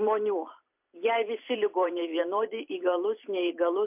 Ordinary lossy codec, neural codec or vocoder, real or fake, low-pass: MP3, 32 kbps; vocoder, 44.1 kHz, 128 mel bands every 256 samples, BigVGAN v2; fake; 3.6 kHz